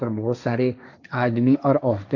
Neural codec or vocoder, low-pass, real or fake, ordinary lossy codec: codec, 16 kHz, 1.1 kbps, Voila-Tokenizer; 7.2 kHz; fake; none